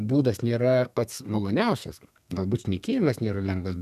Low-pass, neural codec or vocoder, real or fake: 14.4 kHz; codec, 32 kHz, 1.9 kbps, SNAC; fake